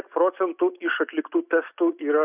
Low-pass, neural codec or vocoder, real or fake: 3.6 kHz; none; real